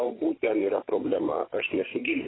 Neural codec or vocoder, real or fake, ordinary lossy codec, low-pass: codec, 16 kHz, 8 kbps, FreqCodec, larger model; fake; AAC, 16 kbps; 7.2 kHz